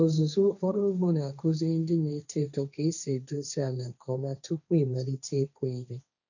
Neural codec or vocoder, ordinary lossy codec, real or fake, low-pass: codec, 16 kHz, 1.1 kbps, Voila-Tokenizer; none; fake; 7.2 kHz